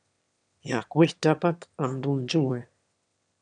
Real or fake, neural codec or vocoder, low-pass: fake; autoencoder, 22.05 kHz, a latent of 192 numbers a frame, VITS, trained on one speaker; 9.9 kHz